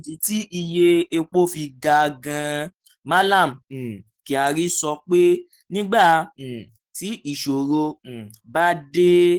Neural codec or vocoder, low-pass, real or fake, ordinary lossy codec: codec, 44.1 kHz, 7.8 kbps, DAC; 19.8 kHz; fake; Opus, 16 kbps